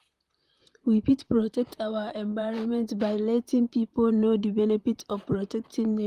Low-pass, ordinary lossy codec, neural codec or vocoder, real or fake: 14.4 kHz; Opus, 32 kbps; vocoder, 44.1 kHz, 128 mel bands, Pupu-Vocoder; fake